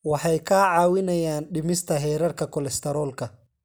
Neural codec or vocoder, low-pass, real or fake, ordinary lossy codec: none; none; real; none